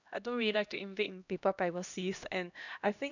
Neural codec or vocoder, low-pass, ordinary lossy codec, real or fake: codec, 16 kHz, 1 kbps, X-Codec, HuBERT features, trained on LibriSpeech; 7.2 kHz; none; fake